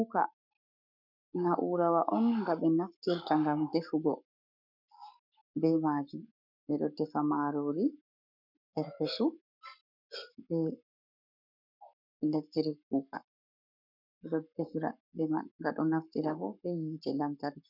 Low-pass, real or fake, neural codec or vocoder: 5.4 kHz; fake; codec, 44.1 kHz, 7.8 kbps, Pupu-Codec